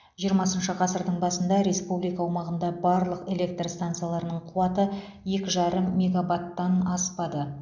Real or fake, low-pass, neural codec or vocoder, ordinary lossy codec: real; 7.2 kHz; none; none